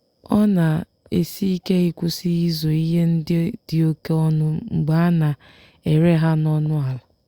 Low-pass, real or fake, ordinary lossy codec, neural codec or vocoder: 19.8 kHz; real; Opus, 64 kbps; none